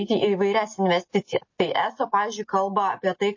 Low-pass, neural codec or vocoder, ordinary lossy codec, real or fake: 7.2 kHz; none; MP3, 32 kbps; real